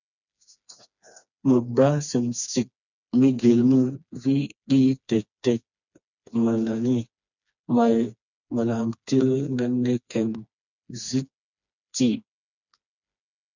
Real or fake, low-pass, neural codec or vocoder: fake; 7.2 kHz; codec, 16 kHz, 2 kbps, FreqCodec, smaller model